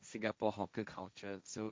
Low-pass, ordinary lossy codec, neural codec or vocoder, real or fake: none; none; codec, 16 kHz, 1.1 kbps, Voila-Tokenizer; fake